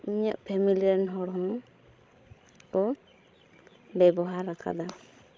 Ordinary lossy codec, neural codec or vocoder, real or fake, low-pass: none; codec, 16 kHz, 16 kbps, FreqCodec, larger model; fake; none